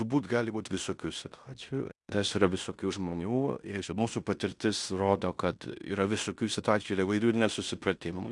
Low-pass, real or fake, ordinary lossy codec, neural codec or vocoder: 10.8 kHz; fake; Opus, 64 kbps; codec, 16 kHz in and 24 kHz out, 0.9 kbps, LongCat-Audio-Codec, fine tuned four codebook decoder